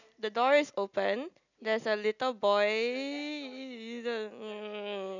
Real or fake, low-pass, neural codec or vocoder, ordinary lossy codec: real; 7.2 kHz; none; none